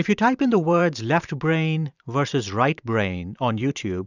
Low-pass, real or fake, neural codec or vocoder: 7.2 kHz; fake; vocoder, 44.1 kHz, 128 mel bands every 512 samples, BigVGAN v2